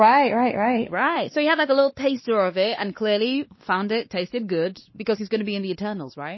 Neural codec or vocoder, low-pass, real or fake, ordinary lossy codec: codec, 16 kHz, 2 kbps, X-Codec, WavLM features, trained on Multilingual LibriSpeech; 7.2 kHz; fake; MP3, 24 kbps